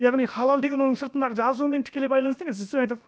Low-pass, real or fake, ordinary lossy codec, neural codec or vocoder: none; fake; none; codec, 16 kHz, about 1 kbps, DyCAST, with the encoder's durations